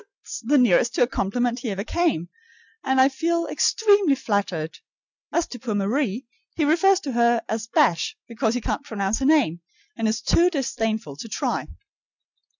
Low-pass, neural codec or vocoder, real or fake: 7.2 kHz; none; real